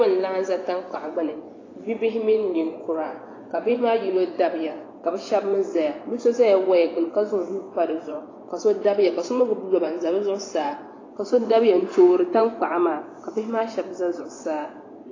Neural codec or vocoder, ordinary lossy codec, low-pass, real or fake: vocoder, 24 kHz, 100 mel bands, Vocos; AAC, 32 kbps; 7.2 kHz; fake